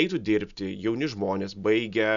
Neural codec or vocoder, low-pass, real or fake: none; 7.2 kHz; real